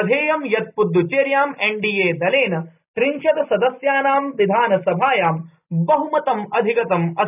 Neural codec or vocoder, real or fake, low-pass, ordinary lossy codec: none; real; 3.6 kHz; none